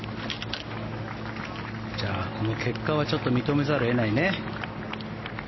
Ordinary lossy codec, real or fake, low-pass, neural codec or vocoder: MP3, 24 kbps; real; 7.2 kHz; none